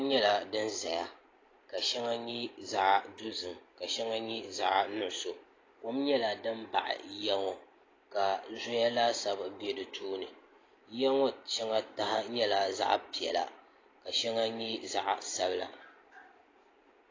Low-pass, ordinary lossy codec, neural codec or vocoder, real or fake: 7.2 kHz; AAC, 32 kbps; none; real